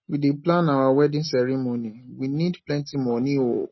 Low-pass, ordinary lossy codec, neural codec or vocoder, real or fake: 7.2 kHz; MP3, 24 kbps; none; real